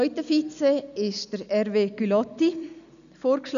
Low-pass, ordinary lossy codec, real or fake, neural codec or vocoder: 7.2 kHz; none; real; none